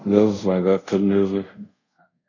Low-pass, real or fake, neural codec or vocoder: 7.2 kHz; fake; codec, 24 kHz, 0.5 kbps, DualCodec